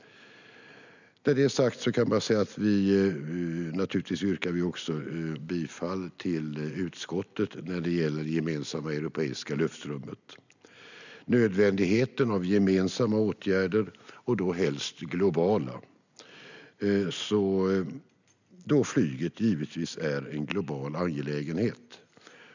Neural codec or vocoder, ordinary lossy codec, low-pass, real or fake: none; none; 7.2 kHz; real